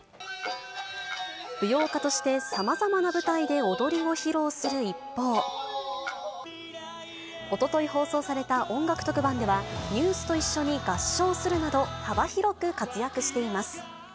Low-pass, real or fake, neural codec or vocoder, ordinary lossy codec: none; real; none; none